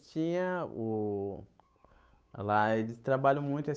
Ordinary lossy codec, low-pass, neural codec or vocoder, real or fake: none; none; codec, 16 kHz, 8 kbps, FunCodec, trained on Chinese and English, 25 frames a second; fake